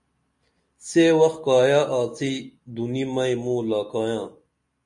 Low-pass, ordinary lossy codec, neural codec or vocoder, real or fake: 10.8 kHz; MP3, 48 kbps; none; real